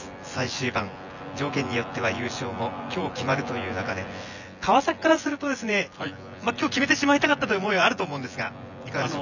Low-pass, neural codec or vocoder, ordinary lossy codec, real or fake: 7.2 kHz; vocoder, 24 kHz, 100 mel bands, Vocos; none; fake